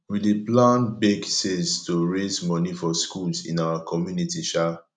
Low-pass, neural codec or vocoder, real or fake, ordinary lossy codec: none; none; real; none